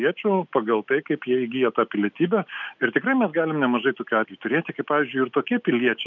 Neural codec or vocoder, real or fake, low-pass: none; real; 7.2 kHz